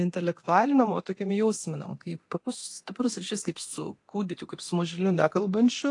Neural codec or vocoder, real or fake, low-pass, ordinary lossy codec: codec, 24 kHz, 0.9 kbps, DualCodec; fake; 10.8 kHz; AAC, 48 kbps